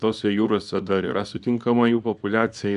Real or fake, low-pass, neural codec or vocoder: fake; 10.8 kHz; codec, 24 kHz, 0.9 kbps, WavTokenizer, small release